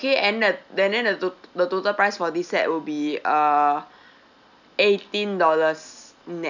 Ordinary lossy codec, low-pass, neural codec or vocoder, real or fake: none; 7.2 kHz; none; real